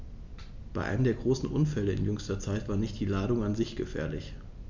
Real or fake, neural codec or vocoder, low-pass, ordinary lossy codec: real; none; 7.2 kHz; MP3, 64 kbps